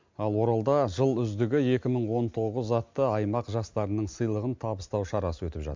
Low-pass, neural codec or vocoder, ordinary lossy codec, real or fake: 7.2 kHz; none; MP3, 64 kbps; real